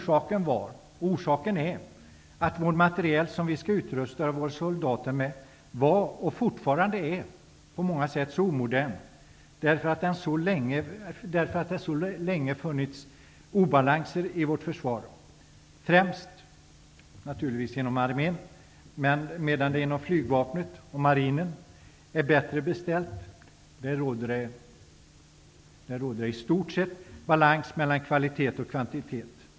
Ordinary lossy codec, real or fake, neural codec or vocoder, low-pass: none; real; none; none